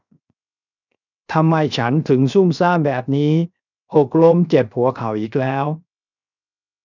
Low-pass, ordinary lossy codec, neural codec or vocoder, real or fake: 7.2 kHz; none; codec, 16 kHz, 0.7 kbps, FocalCodec; fake